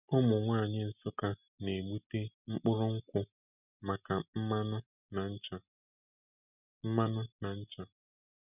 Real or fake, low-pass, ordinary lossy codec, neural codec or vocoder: real; 3.6 kHz; none; none